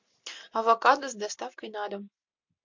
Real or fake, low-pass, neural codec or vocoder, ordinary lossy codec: real; 7.2 kHz; none; MP3, 48 kbps